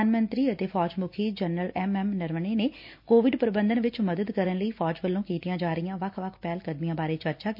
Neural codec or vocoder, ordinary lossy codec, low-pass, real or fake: none; MP3, 32 kbps; 5.4 kHz; real